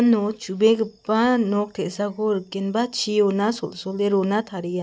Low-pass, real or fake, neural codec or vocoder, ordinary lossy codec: none; real; none; none